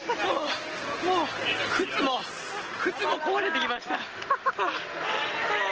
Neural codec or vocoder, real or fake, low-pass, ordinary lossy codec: none; real; 7.2 kHz; Opus, 16 kbps